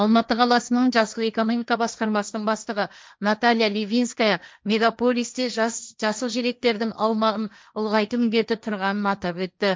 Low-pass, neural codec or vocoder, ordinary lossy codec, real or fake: none; codec, 16 kHz, 1.1 kbps, Voila-Tokenizer; none; fake